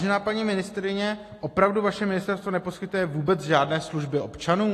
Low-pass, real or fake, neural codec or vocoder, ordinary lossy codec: 14.4 kHz; real; none; AAC, 48 kbps